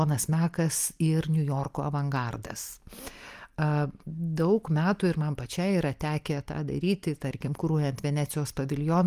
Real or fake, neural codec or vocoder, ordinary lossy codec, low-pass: real; none; Opus, 32 kbps; 14.4 kHz